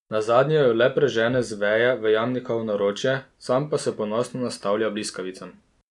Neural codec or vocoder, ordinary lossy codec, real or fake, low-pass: none; none; real; 10.8 kHz